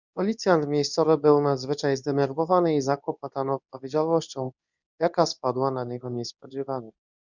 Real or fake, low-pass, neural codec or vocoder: fake; 7.2 kHz; codec, 24 kHz, 0.9 kbps, WavTokenizer, medium speech release version 2